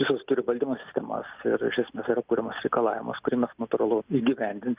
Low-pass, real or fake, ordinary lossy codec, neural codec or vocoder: 3.6 kHz; real; Opus, 24 kbps; none